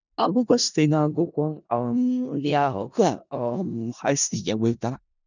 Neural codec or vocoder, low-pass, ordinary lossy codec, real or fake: codec, 16 kHz in and 24 kHz out, 0.4 kbps, LongCat-Audio-Codec, four codebook decoder; 7.2 kHz; none; fake